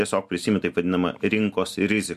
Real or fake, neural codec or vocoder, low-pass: real; none; 14.4 kHz